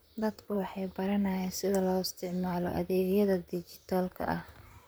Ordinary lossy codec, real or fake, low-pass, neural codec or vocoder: none; fake; none; vocoder, 44.1 kHz, 128 mel bands, Pupu-Vocoder